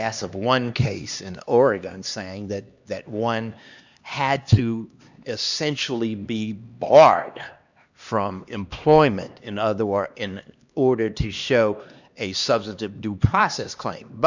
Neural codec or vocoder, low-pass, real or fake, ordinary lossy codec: codec, 16 kHz, 2 kbps, X-Codec, HuBERT features, trained on LibriSpeech; 7.2 kHz; fake; Opus, 64 kbps